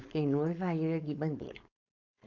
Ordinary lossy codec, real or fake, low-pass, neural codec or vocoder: MP3, 64 kbps; fake; 7.2 kHz; codec, 16 kHz, 4.8 kbps, FACodec